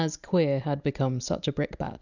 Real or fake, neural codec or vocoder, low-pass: real; none; 7.2 kHz